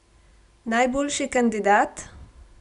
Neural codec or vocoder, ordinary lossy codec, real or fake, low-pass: none; MP3, 96 kbps; real; 10.8 kHz